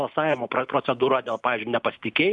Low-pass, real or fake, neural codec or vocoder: 10.8 kHz; real; none